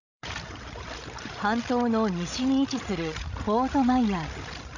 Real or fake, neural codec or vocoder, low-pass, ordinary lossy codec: fake; codec, 16 kHz, 16 kbps, FreqCodec, larger model; 7.2 kHz; none